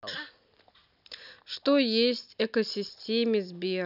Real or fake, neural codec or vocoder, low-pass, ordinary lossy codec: real; none; 5.4 kHz; none